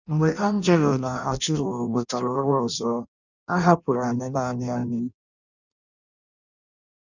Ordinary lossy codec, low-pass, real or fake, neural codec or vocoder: none; 7.2 kHz; fake; codec, 16 kHz in and 24 kHz out, 0.6 kbps, FireRedTTS-2 codec